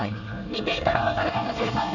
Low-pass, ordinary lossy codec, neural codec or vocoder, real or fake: 7.2 kHz; none; codec, 24 kHz, 1 kbps, SNAC; fake